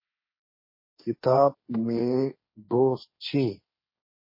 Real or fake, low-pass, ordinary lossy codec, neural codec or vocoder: fake; 5.4 kHz; MP3, 24 kbps; codec, 16 kHz, 1.1 kbps, Voila-Tokenizer